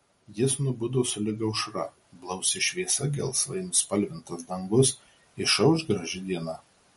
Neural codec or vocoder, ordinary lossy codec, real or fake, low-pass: none; MP3, 48 kbps; real; 19.8 kHz